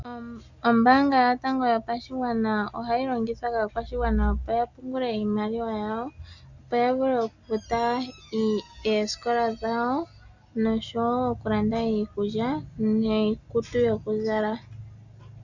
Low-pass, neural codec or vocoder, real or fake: 7.2 kHz; none; real